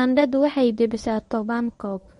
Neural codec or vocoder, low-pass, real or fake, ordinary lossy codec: codec, 24 kHz, 0.9 kbps, WavTokenizer, medium speech release version 1; 10.8 kHz; fake; MP3, 48 kbps